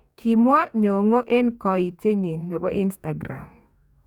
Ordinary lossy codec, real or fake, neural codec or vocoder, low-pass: none; fake; codec, 44.1 kHz, 2.6 kbps, DAC; 19.8 kHz